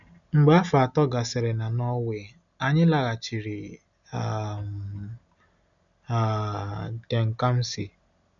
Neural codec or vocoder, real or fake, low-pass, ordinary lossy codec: none; real; 7.2 kHz; none